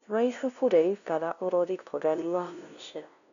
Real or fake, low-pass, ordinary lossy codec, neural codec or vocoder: fake; 7.2 kHz; none; codec, 16 kHz, 0.5 kbps, FunCodec, trained on LibriTTS, 25 frames a second